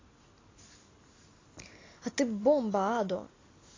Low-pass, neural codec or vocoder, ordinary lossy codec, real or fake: 7.2 kHz; none; AAC, 32 kbps; real